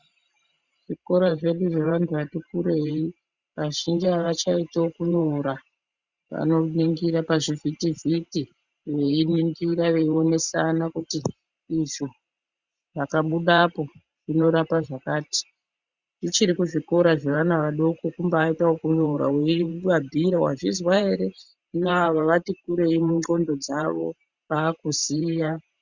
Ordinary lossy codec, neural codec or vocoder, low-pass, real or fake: Opus, 64 kbps; vocoder, 44.1 kHz, 128 mel bands every 512 samples, BigVGAN v2; 7.2 kHz; fake